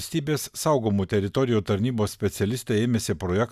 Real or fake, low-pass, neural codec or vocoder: real; 14.4 kHz; none